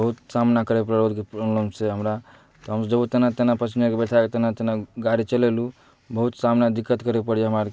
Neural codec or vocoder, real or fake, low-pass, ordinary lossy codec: none; real; none; none